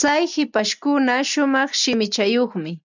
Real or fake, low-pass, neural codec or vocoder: real; 7.2 kHz; none